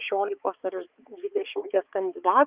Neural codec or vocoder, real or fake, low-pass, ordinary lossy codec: codec, 16 kHz, 4 kbps, X-Codec, HuBERT features, trained on balanced general audio; fake; 3.6 kHz; Opus, 24 kbps